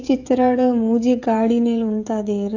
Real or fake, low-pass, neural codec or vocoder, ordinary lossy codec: fake; 7.2 kHz; codec, 16 kHz, 16 kbps, FreqCodec, smaller model; AAC, 48 kbps